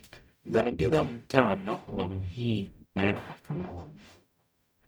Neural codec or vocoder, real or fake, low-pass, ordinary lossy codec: codec, 44.1 kHz, 0.9 kbps, DAC; fake; none; none